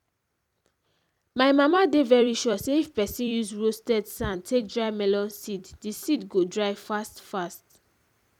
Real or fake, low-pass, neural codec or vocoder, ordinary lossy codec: fake; 19.8 kHz; vocoder, 44.1 kHz, 128 mel bands every 256 samples, BigVGAN v2; none